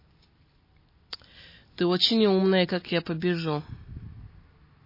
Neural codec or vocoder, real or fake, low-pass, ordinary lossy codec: none; real; 5.4 kHz; MP3, 24 kbps